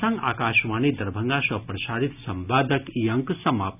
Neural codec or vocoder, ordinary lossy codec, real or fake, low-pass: none; none; real; 3.6 kHz